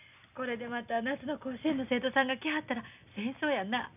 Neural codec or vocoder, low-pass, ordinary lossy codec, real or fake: none; 3.6 kHz; none; real